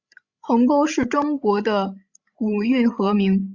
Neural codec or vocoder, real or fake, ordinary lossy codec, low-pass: codec, 16 kHz, 16 kbps, FreqCodec, larger model; fake; Opus, 64 kbps; 7.2 kHz